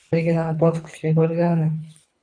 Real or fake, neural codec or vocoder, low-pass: fake; codec, 24 kHz, 3 kbps, HILCodec; 9.9 kHz